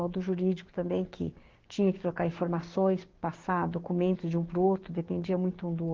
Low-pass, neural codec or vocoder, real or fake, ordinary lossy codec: 7.2 kHz; codec, 16 kHz, 6 kbps, DAC; fake; Opus, 16 kbps